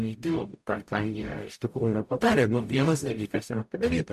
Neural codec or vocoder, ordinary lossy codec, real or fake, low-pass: codec, 44.1 kHz, 0.9 kbps, DAC; MP3, 64 kbps; fake; 14.4 kHz